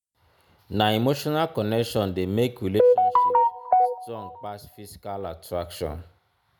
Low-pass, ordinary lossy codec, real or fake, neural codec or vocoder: none; none; real; none